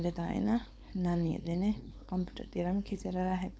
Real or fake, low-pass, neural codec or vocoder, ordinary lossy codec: fake; none; codec, 16 kHz, 4.8 kbps, FACodec; none